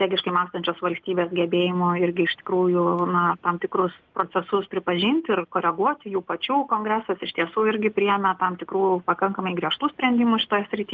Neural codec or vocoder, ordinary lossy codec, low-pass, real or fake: none; Opus, 32 kbps; 7.2 kHz; real